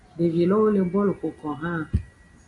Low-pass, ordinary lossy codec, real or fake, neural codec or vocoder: 10.8 kHz; AAC, 48 kbps; real; none